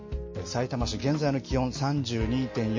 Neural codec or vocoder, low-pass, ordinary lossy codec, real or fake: none; 7.2 kHz; MP3, 32 kbps; real